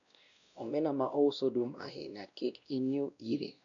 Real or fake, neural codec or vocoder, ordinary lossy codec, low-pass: fake; codec, 16 kHz, 1 kbps, X-Codec, WavLM features, trained on Multilingual LibriSpeech; none; 7.2 kHz